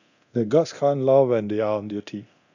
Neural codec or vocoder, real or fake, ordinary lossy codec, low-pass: codec, 24 kHz, 0.9 kbps, DualCodec; fake; none; 7.2 kHz